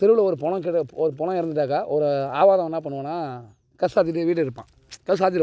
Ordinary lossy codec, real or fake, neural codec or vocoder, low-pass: none; real; none; none